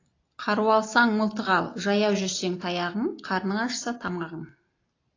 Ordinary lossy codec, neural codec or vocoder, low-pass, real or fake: AAC, 32 kbps; none; 7.2 kHz; real